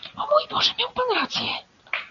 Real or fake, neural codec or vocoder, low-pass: real; none; 7.2 kHz